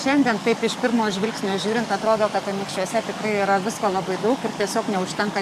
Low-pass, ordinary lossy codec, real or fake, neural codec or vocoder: 14.4 kHz; MP3, 64 kbps; fake; codec, 44.1 kHz, 7.8 kbps, DAC